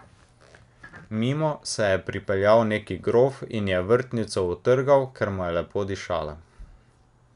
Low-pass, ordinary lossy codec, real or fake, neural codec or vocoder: 10.8 kHz; none; real; none